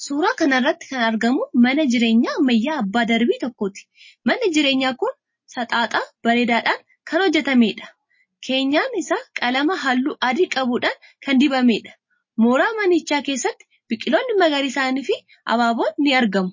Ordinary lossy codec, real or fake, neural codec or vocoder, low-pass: MP3, 32 kbps; real; none; 7.2 kHz